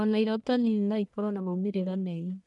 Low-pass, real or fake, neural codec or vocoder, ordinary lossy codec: 10.8 kHz; fake; codec, 44.1 kHz, 1.7 kbps, Pupu-Codec; none